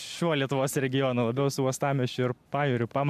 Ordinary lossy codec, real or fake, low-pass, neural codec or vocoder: MP3, 96 kbps; real; 14.4 kHz; none